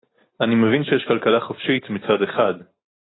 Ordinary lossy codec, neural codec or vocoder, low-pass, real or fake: AAC, 16 kbps; none; 7.2 kHz; real